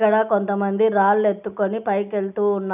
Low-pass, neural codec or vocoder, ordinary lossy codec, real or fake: 3.6 kHz; none; none; real